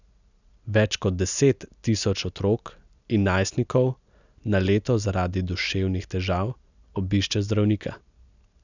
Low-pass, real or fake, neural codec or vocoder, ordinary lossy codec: 7.2 kHz; real; none; none